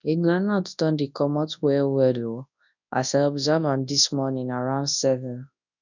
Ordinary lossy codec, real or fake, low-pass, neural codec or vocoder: none; fake; 7.2 kHz; codec, 24 kHz, 0.9 kbps, WavTokenizer, large speech release